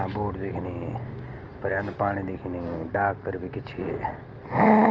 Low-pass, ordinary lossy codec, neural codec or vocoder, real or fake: 7.2 kHz; Opus, 24 kbps; none; real